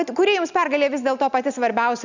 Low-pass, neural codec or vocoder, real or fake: 7.2 kHz; none; real